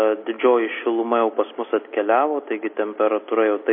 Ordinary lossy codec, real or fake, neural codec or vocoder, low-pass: MP3, 24 kbps; real; none; 5.4 kHz